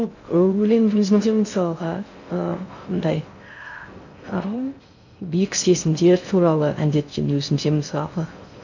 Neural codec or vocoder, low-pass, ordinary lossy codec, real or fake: codec, 16 kHz in and 24 kHz out, 0.6 kbps, FocalCodec, streaming, 2048 codes; 7.2 kHz; none; fake